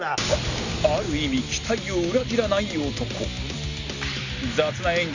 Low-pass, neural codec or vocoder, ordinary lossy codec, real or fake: 7.2 kHz; none; Opus, 64 kbps; real